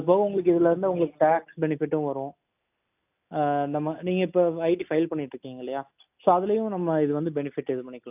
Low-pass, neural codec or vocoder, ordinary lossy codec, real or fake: 3.6 kHz; none; AAC, 32 kbps; real